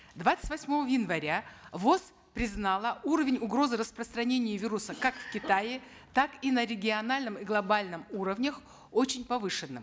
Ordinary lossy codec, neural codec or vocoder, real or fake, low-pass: none; none; real; none